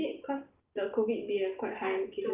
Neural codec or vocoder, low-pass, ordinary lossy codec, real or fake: none; 3.6 kHz; Opus, 32 kbps; real